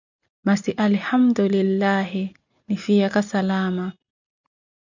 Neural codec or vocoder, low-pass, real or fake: none; 7.2 kHz; real